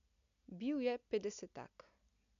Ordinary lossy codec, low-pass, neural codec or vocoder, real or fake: none; 7.2 kHz; none; real